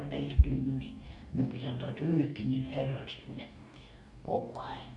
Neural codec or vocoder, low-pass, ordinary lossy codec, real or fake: codec, 44.1 kHz, 2.6 kbps, DAC; 10.8 kHz; none; fake